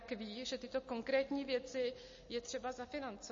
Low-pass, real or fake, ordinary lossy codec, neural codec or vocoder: 7.2 kHz; real; MP3, 32 kbps; none